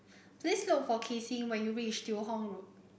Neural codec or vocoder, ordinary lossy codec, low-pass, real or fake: none; none; none; real